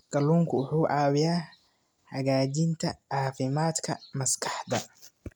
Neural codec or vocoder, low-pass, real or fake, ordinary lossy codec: none; none; real; none